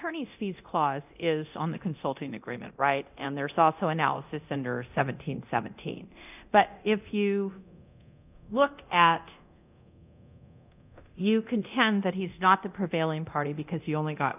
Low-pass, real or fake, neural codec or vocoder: 3.6 kHz; fake; codec, 24 kHz, 0.9 kbps, DualCodec